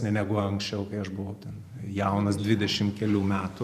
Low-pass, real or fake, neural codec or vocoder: 14.4 kHz; fake; vocoder, 44.1 kHz, 128 mel bands every 512 samples, BigVGAN v2